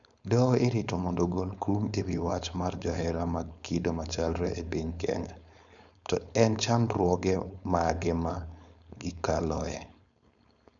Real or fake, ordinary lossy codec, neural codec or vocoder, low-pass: fake; none; codec, 16 kHz, 4.8 kbps, FACodec; 7.2 kHz